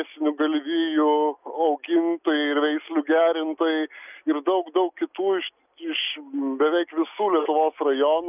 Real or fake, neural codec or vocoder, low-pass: real; none; 3.6 kHz